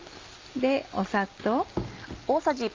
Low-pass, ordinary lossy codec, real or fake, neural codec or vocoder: 7.2 kHz; Opus, 32 kbps; real; none